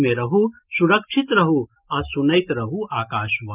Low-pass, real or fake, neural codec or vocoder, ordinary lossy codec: 3.6 kHz; real; none; Opus, 24 kbps